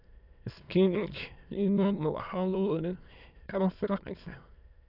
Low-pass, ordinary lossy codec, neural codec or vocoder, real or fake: 5.4 kHz; none; autoencoder, 22.05 kHz, a latent of 192 numbers a frame, VITS, trained on many speakers; fake